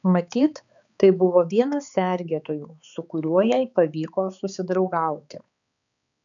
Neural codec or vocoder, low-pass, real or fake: codec, 16 kHz, 4 kbps, X-Codec, HuBERT features, trained on balanced general audio; 7.2 kHz; fake